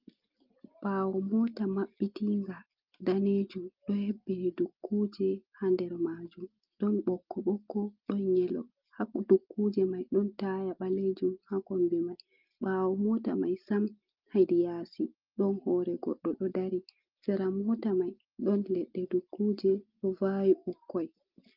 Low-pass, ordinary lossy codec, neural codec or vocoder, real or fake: 5.4 kHz; Opus, 24 kbps; none; real